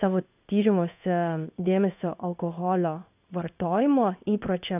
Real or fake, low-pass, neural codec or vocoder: fake; 3.6 kHz; codec, 16 kHz in and 24 kHz out, 1 kbps, XY-Tokenizer